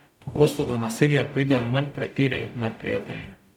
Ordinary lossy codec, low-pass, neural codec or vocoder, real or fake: none; 19.8 kHz; codec, 44.1 kHz, 0.9 kbps, DAC; fake